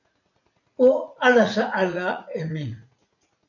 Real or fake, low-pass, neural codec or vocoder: fake; 7.2 kHz; vocoder, 44.1 kHz, 80 mel bands, Vocos